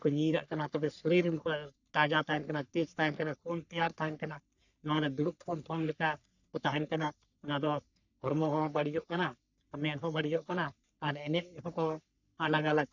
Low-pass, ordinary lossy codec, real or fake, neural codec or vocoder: 7.2 kHz; none; fake; codec, 44.1 kHz, 3.4 kbps, Pupu-Codec